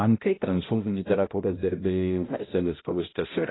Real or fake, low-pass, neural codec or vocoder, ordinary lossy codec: fake; 7.2 kHz; codec, 16 kHz, 0.5 kbps, X-Codec, HuBERT features, trained on balanced general audio; AAC, 16 kbps